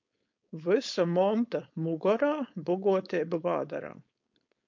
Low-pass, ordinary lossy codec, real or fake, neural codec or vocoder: 7.2 kHz; MP3, 48 kbps; fake; codec, 16 kHz, 4.8 kbps, FACodec